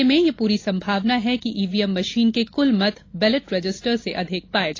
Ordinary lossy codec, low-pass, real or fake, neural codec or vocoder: AAC, 32 kbps; 7.2 kHz; real; none